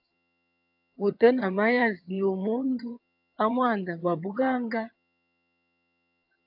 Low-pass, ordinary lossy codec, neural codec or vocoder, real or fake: 5.4 kHz; AAC, 48 kbps; vocoder, 22.05 kHz, 80 mel bands, HiFi-GAN; fake